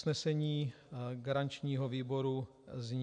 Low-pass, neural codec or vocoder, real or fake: 9.9 kHz; none; real